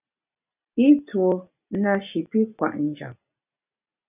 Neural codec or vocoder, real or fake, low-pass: none; real; 3.6 kHz